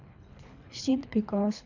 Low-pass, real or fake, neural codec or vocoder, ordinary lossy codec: 7.2 kHz; fake; codec, 24 kHz, 3 kbps, HILCodec; none